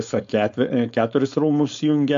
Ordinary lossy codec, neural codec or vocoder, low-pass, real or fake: AAC, 64 kbps; codec, 16 kHz, 4.8 kbps, FACodec; 7.2 kHz; fake